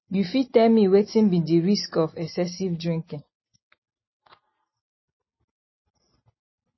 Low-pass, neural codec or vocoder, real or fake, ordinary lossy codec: 7.2 kHz; none; real; MP3, 24 kbps